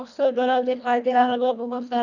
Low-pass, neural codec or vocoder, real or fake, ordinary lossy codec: 7.2 kHz; codec, 24 kHz, 1.5 kbps, HILCodec; fake; none